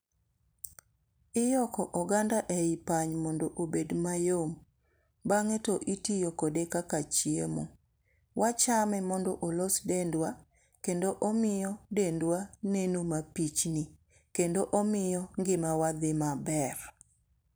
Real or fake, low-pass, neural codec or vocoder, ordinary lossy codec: fake; none; vocoder, 44.1 kHz, 128 mel bands every 256 samples, BigVGAN v2; none